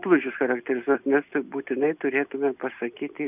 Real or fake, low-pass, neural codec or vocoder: real; 3.6 kHz; none